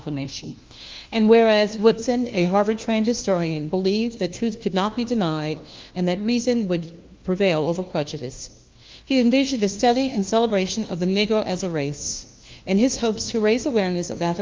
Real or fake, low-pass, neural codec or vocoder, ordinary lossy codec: fake; 7.2 kHz; codec, 16 kHz, 1 kbps, FunCodec, trained on LibriTTS, 50 frames a second; Opus, 32 kbps